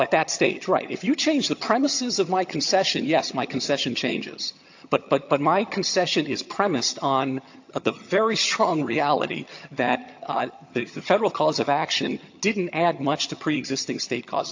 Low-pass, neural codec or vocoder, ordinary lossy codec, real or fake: 7.2 kHz; vocoder, 22.05 kHz, 80 mel bands, HiFi-GAN; AAC, 48 kbps; fake